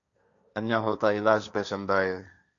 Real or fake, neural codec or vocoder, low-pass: fake; codec, 16 kHz, 1.1 kbps, Voila-Tokenizer; 7.2 kHz